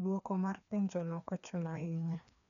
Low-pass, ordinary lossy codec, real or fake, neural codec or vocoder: 7.2 kHz; MP3, 96 kbps; fake; codec, 16 kHz, 2 kbps, FreqCodec, larger model